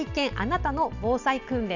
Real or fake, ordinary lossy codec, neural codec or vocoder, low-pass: real; none; none; 7.2 kHz